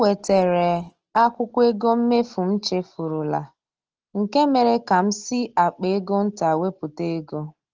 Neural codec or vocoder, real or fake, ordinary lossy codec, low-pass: none; real; Opus, 16 kbps; 7.2 kHz